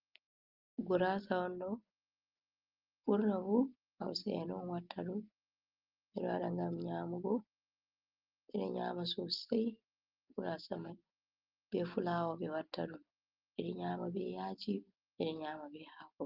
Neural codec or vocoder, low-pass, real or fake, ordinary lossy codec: none; 5.4 kHz; real; Opus, 32 kbps